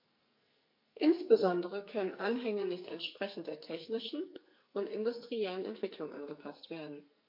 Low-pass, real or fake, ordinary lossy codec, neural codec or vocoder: 5.4 kHz; fake; MP3, 32 kbps; codec, 44.1 kHz, 2.6 kbps, SNAC